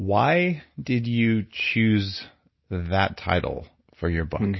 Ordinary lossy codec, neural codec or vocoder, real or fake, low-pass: MP3, 24 kbps; none; real; 7.2 kHz